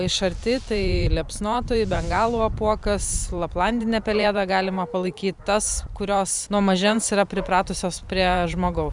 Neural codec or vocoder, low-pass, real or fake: vocoder, 44.1 kHz, 128 mel bands every 512 samples, BigVGAN v2; 10.8 kHz; fake